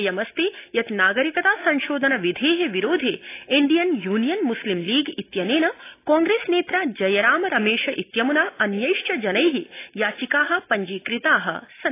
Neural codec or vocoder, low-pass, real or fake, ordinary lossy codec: none; 3.6 kHz; real; AAC, 24 kbps